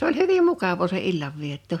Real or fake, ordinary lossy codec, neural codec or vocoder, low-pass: real; none; none; 19.8 kHz